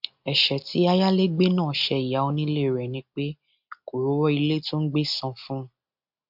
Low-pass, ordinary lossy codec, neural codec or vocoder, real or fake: 5.4 kHz; MP3, 48 kbps; none; real